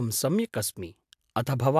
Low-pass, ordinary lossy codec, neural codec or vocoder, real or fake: 14.4 kHz; AAC, 96 kbps; none; real